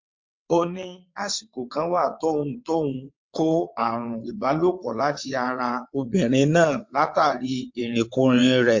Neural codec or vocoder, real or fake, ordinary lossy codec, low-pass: vocoder, 22.05 kHz, 80 mel bands, WaveNeXt; fake; MP3, 48 kbps; 7.2 kHz